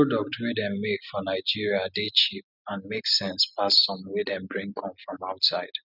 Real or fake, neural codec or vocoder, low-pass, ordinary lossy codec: real; none; 5.4 kHz; none